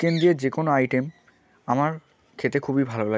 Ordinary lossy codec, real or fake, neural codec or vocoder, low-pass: none; real; none; none